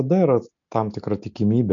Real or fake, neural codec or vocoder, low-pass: real; none; 7.2 kHz